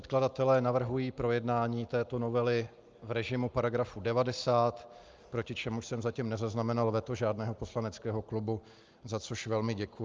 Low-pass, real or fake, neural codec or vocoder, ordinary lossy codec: 7.2 kHz; real; none; Opus, 24 kbps